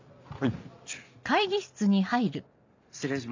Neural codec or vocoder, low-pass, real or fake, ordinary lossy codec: none; 7.2 kHz; real; MP3, 64 kbps